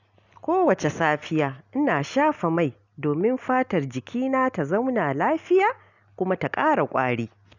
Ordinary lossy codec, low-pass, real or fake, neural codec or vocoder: none; 7.2 kHz; real; none